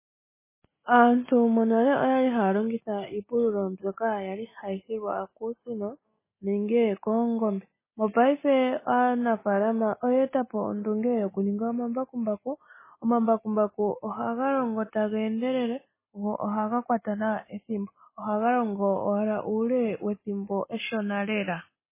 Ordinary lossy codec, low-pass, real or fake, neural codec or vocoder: MP3, 16 kbps; 3.6 kHz; real; none